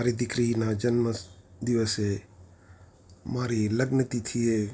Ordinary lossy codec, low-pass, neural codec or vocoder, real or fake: none; none; none; real